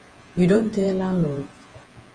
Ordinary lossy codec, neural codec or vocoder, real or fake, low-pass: Opus, 24 kbps; vocoder, 48 kHz, 128 mel bands, Vocos; fake; 9.9 kHz